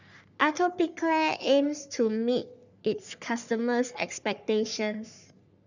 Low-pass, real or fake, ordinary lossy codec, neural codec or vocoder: 7.2 kHz; fake; none; codec, 44.1 kHz, 3.4 kbps, Pupu-Codec